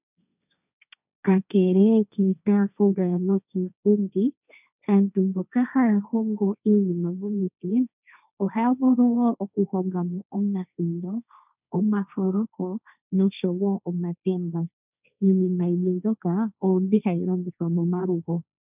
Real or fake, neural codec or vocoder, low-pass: fake; codec, 16 kHz, 1.1 kbps, Voila-Tokenizer; 3.6 kHz